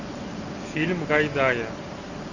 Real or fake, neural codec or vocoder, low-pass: real; none; 7.2 kHz